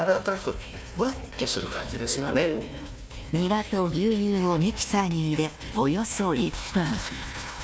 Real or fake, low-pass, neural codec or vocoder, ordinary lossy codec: fake; none; codec, 16 kHz, 1 kbps, FunCodec, trained on Chinese and English, 50 frames a second; none